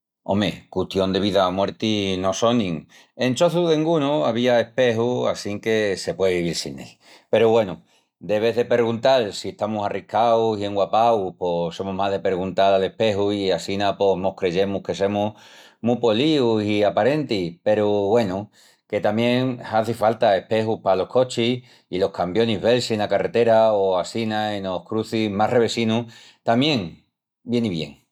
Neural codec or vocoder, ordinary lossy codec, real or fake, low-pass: none; none; real; 19.8 kHz